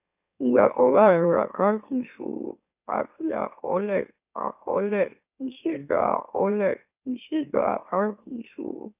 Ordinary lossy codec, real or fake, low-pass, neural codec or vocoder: none; fake; 3.6 kHz; autoencoder, 44.1 kHz, a latent of 192 numbers a frame, MeloTTS